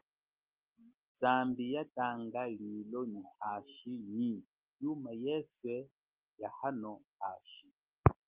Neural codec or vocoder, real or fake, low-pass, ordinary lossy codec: none; real; 3.6 kHz; Opus, 32 kbps